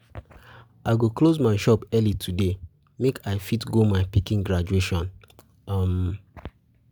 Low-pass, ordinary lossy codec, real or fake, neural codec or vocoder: none; none; real; none